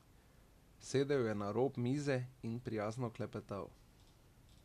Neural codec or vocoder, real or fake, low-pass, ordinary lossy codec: none; real; 14.4 kHz; MP3, 96 kbps